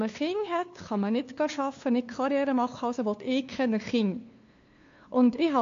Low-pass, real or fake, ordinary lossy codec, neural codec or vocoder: 7.2 kHz; fake; AAC, 48 kbps; codec, 16 kHz, 2 kbps, FunCodec, trained on LibriTTS, 25 frames a second